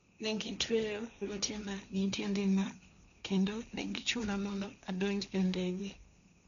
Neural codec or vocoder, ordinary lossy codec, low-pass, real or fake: codec, 16 kHz, 1.1 kbps, Voila-Tokenizer; none; 7.2 kHz; fake